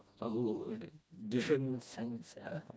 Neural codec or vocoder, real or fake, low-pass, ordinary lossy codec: codec, 16 kHz, 1 kbps, FreqCodec, smaller model; fake; none; none